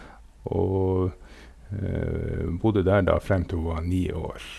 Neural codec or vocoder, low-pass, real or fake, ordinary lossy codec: none; none; real; none